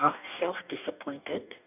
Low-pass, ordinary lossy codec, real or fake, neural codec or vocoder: 3.6 kHz; none; fake; codec, 44.1 kHz, 2.6 kbps, DAC